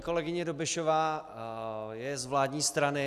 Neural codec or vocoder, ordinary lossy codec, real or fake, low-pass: none; AAC, 96 kbps; real; 14.4 kHz